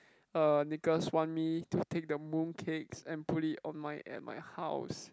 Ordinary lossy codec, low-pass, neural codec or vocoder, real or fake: none; none; none; real